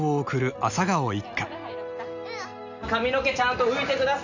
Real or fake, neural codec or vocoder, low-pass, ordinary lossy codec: real; none; 7.2 kHz; none